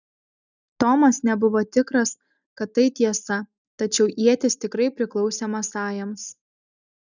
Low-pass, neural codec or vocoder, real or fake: 7.2 kHz; none; real